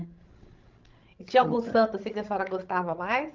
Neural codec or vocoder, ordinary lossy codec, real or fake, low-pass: codec, 16 kHz, 16 kbps, FreqCodec, larger model; Opus, 32 kbps; fake; 7.2 kHz